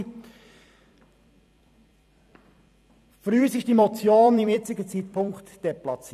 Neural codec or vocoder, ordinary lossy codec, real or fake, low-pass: vocoder, 44.1 kHz, 128 mel bands every 256 samples, BigVGAN v2; none; fake; 14.4 kHz